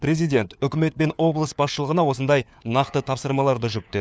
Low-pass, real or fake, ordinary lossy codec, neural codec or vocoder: none; fake; none; codec, 16 kHz, 4 kbps, FunCodec, trained on LibriTTS, 50 frames a second